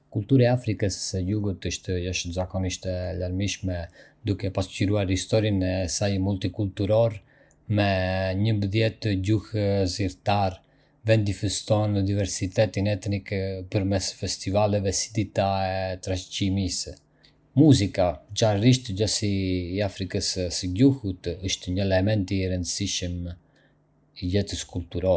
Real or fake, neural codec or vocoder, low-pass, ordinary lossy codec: real; none; none; none